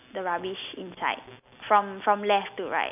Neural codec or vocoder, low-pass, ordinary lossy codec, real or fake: none; 3.6 kHz; none; real